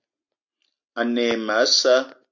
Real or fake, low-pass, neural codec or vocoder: real; 7.2 kHz; none